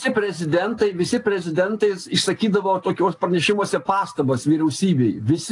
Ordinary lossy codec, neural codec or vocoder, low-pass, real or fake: AAC, 48 kbps; none; 10.8 kHz; real